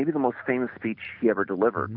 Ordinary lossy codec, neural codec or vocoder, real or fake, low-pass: MP3, 48 kbps; none; real; 5.4 kHz